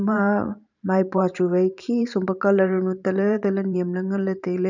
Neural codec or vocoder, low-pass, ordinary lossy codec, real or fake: vocoder, 44.1 kHz, 128 mel bands every 512 samples, BigVGAN v2; 7.2 kHz; none; fake